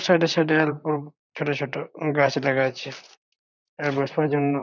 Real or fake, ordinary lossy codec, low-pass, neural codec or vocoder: fake; none; 7.2 kHz; vocoder, 44.1 kHz, 128 mel bands, Pupu-Vocoder